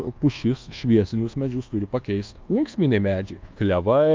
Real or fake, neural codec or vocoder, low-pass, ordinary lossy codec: fake; codec, 24 kHz, 1.2 kbps, DualCodec; 7.2 kHz; Opus, 24 kbps